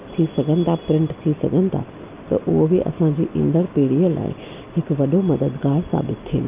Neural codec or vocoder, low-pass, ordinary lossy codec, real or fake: none; 3.6 kHz; Opus, 24 kbps; real